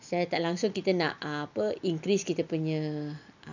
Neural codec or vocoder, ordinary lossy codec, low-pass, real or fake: none; none; 7.2 kHz; real